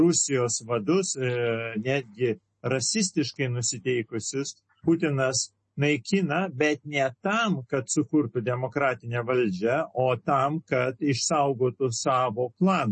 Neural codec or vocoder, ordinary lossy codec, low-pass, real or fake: none; MP3, 32 kbps; 10.8 kHz; real